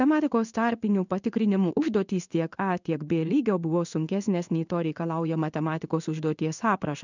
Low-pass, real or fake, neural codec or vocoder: 7.2 kHz; fake; codec, 16 kHz in and 24 kHz out, 1 kbps, XY-Tokenizer